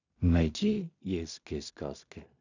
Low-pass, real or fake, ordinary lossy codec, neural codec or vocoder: 7.2 kHz; fake; none; codec, 16 kHz in and 24 kHz out, 0.4 kbps, LongCat-Audio-Codec, two codebook decoder